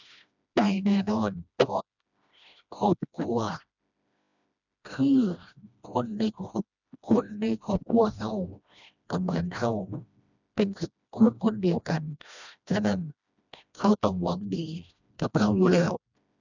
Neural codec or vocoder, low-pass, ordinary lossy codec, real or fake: codec, 16 kHz, 1 kbps, FreqCodec, smaller model; 7.2 kHz; none; fake